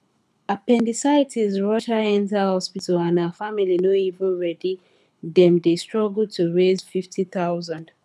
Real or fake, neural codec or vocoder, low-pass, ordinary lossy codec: fake; codec, 24 kHz, 6 kbps, HILCodec; none; none